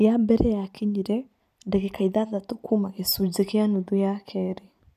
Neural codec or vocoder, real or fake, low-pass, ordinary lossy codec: none; real; 14.4 kHz; none